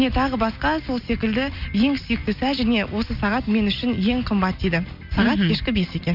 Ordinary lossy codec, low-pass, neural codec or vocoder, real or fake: none; 5.4 kHz; none; real